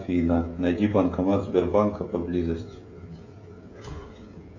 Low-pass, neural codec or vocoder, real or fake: 7.2 kHz; codec, 16 kHz, 16 kbps, FreqCodec, smaller model; fake